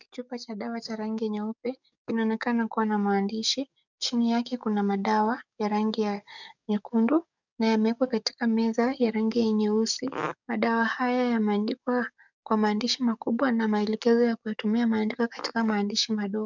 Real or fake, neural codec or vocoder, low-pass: fake; codec, 44.1 kHz, 7.8 kbps, DAC; 7.2 kHz